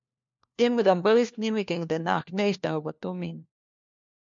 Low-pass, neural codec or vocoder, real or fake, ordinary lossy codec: 7.2 kHz; codec, 16 kHz, 1 kbps, FunCodec, trained on LibriTTS, 50 frames a second; fake; MP3, 64 kbps